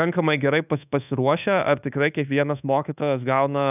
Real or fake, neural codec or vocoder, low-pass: fake; codec, 24 kHz, 1.2 kbps, DualCodec; 3.6 kHz